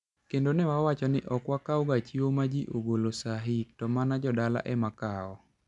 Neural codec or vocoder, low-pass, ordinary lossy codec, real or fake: none; 10.8 kHz; none; real